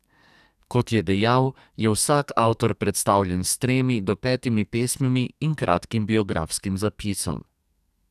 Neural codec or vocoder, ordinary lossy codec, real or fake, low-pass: codec, 44.1 kHz, 2.6 kbps, SNAC; none; fake; 14.4 kHz